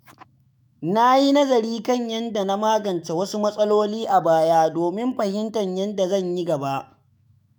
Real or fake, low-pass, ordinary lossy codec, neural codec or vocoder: fake; none; none; autoencoder, 48 kHz, 128 numbers a frame, DAC-VAE, trained on Japanese speech